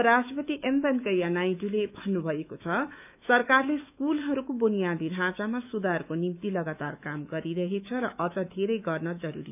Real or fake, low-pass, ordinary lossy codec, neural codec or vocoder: fake; 3.6 kHz; none; autoencoder, 48 kHz, 128 numbers a frame, DAC-VAE, trained on Japanese speech